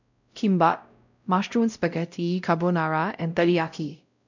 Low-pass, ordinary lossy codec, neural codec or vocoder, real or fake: 7.2 kHz; none; codec, 16 kHz, 0.5 kbps, X-Codec, WavLM features, trained on Multilingual LibriSpeech; fake